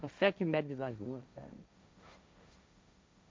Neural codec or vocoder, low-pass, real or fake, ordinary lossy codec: codec, 16 kHz, 1.1 kbps, Voila-Tokenizer; none; fake; none